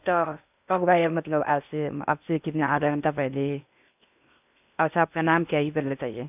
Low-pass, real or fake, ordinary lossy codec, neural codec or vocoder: 3.6 kHz; fake; none; codec, 16 kHz in and 24 kHz out, 0.6 kbps, FocalCodec, streaming, 2048 codes